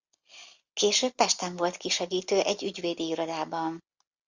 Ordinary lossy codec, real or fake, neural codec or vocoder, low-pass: Opus, 64 kbps; real; none; 7.2 kHz